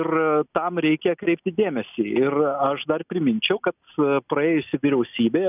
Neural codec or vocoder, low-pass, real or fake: none; 3.6 kHz; real